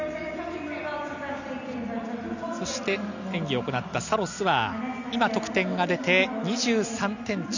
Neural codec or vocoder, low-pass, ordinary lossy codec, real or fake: none; 7.2 kHz; none; real